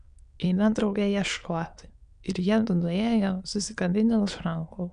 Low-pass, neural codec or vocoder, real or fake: 9.9 kHz; autoencoder, 22.05 kHz, a latent of 192 numbers a frame, VITS, trained on many speakers; fake